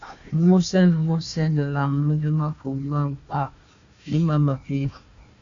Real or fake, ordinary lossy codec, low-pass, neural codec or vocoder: fake; AAC, 64 kbps; 7.2 kHz; codec, 16 kHz, 1 kbps, FunCodec, trained on Chinese and English, 50 frames a second